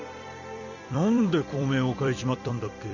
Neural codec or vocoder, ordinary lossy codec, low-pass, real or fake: vocoder, 44.1 kHz, 128 mel bands every 256 samples, BigVGAN v2; none; 7.2 kHz; fake